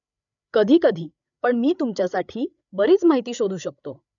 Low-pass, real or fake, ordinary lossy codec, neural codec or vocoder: 7.2 kHz; fake; none; codec, 16 kHz, 8 kbps, FreqCodec, larger model